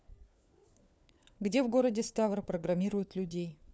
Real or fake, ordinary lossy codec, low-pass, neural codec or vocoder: fake; none; none; codec, 16 kHz, 4 kbps, FunCodec, trained on LibriTTS, 50 frames a second